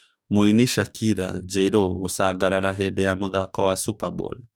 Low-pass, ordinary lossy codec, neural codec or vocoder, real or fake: none; none; codec, 44.1 kHz, 2.6 kbps, DAC; fake